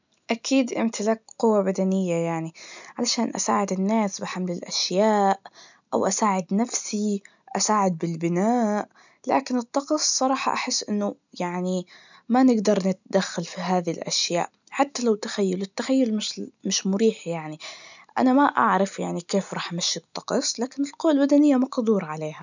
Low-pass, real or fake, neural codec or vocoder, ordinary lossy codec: 7.2 kHz; real; none; none